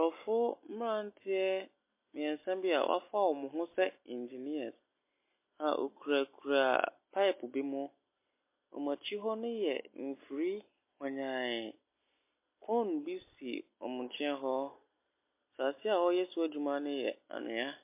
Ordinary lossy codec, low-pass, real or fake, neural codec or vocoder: MP3, 24 kbps; 3.6 kHz; real; none